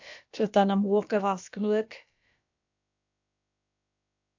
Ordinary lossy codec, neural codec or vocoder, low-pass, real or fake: AAC, 48 kbps; codec, 16 kHz, about 1 kbps, DyCAST, with the encoder's durations; 7.2 kHz; fake